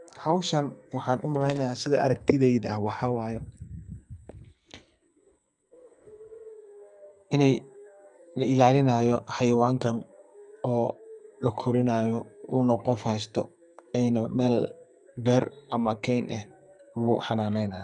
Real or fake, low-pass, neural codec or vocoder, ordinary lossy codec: fake; 10.8 kHz; codec, 44.1 kHz, 2.6 kbps, SNAC; none